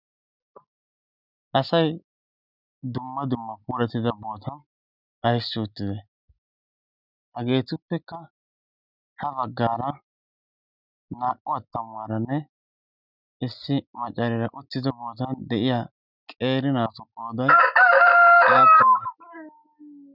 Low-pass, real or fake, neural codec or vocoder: 5.4 kHz; real; none